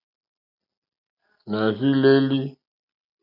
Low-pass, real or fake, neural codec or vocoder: 5.4 kHz; real; none